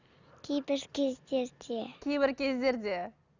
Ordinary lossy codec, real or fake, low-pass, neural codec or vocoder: Opus, 64 kbps; real; 7.2 kHz; none